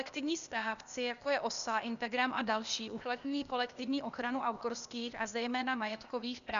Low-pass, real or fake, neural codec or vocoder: 7.2 kHz; fake; codec, 16 kHz, 0.8 kbps, ZipCodec